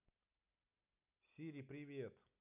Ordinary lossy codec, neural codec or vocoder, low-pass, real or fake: none; none; 3.6 kHz; real